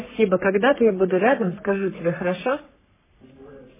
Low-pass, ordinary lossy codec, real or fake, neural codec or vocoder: 3.6 kHz; MP3, 16 kbps; fake; codec, 44.1 kHz, 3.4 kbps, Pupu-Codec